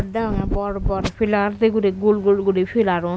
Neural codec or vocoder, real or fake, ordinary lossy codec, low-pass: none; real; none; none